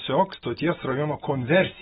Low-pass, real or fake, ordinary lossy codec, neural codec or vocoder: 19.8 kHz; real; AAC, 16 kbps; none